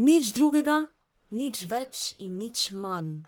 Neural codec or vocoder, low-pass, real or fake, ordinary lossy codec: codec, 44.1 kHz, 1.7 kbps, Pupu-Codec; none; fake; none